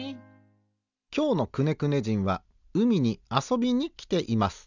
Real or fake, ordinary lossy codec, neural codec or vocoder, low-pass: real; none; none; 7.2 kHz